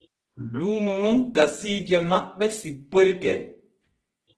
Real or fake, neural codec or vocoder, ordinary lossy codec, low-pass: fake; codec, 24 kHz, 0.9 kbps, WavTokenizer, medium music audio release; Opus, 16 kbps; 10.8 kHz